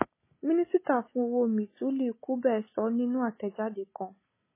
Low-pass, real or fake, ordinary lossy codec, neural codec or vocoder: 3.6 kHz; real; MP3, 16 kbps; none